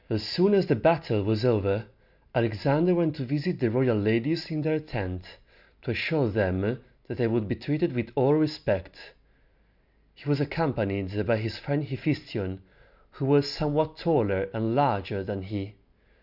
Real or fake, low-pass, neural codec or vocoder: real; 5.4 kHz; none